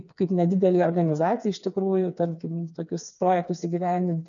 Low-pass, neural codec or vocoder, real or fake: 7.2 kHz; codec, 16 kHz, 4 kbps, FreqCodec, smaller model; fake